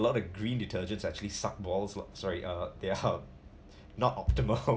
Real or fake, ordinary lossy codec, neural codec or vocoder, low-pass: real; none; none; none